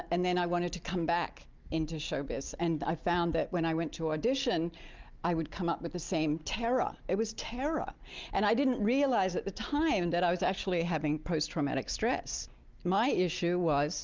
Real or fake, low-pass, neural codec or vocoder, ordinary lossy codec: real; 7.2 kHz; none; Opus, 32 kbps